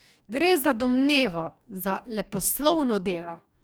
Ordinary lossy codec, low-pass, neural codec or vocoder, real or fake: none; none; codec, 44.1 kHz, 2.6 kbps, DAC; fake